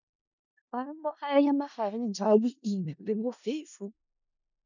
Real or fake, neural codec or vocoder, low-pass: fake; codec, 16 kHz in and 24 kHz out, 0.4 kbps, LongCat-Audio-Codec, four codebook decoder; 7.2 kHz